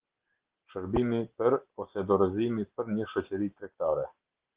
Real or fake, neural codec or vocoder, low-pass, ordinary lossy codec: real; none; 3.6 kHz; Opus, 16 kbps